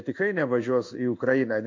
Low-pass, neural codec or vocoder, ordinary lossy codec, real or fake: 7.2 kHz; codec, 16 kHz in and 24 kHz out, 1 kbps, XY-Tokenizer; AAC, 48 kbps; fake